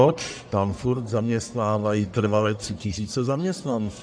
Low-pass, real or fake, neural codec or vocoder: 9.9 kHz; fake; codec, 44.1 kHz, 1.7 kbps, Pupu-Codec